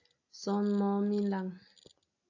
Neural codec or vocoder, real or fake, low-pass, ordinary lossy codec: none; real; 7.2 kHz; AAC, 48 kbps